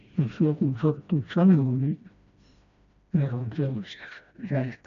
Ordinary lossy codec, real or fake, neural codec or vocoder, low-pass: AAC, 64 kbps; fake; codec, 16 kHz, 1 kbps, FreqCodec, smaller model; 7.2 kHz